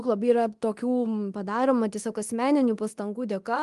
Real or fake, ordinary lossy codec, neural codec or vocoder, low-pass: fake; Opus, 24 kbps; codec, 24 kHz, 0.9 kbps, DualCodec; 10.8 kHz